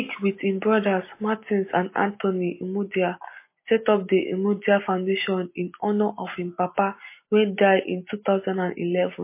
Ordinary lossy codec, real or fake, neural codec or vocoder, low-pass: MP3, 24 kbps; real; none; 3.6 kHz